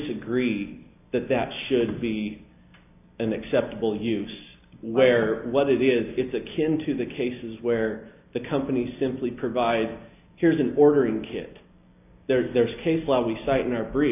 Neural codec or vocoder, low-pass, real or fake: none; 3.6 kHz; real